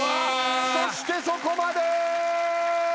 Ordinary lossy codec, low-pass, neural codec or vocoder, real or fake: none; none; none; real